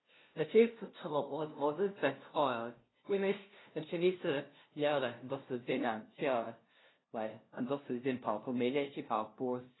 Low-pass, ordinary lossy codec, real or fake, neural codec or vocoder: 7.2 kHz; AAC, 16 kbps; fake; codec, 16 kHz, 0.5 kbps, FunCodec, trained on LibriTTS, 25 frames a second